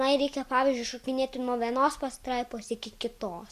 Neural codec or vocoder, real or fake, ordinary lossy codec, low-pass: none; real; Opus, 64 kbps; 14.4 kHz